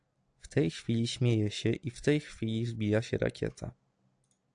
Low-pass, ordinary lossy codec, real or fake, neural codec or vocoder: 9.9 kHz; AAC, 64 kbps; real; none